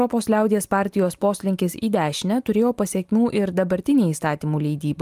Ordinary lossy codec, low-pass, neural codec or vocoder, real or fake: Opus, 32 kbps; 14.4 kHz; none; real